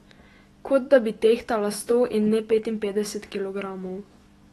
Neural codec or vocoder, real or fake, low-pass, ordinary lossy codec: autoencoder, 48 kHz, 128 numbers a frame, DAC-VAE, trained on Japanese speech; fake; 19.8 kHz; AAC, 32 kbps